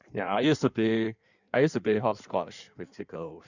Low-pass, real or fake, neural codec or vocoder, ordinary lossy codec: 7.2 kHz; fake; codec, 16 kHz in and 24 kHz out, 1.1 kbps, FireRedTTS-2 codec; none